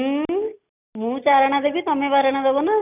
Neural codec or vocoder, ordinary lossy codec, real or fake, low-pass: none; none; real; 3.6 kHz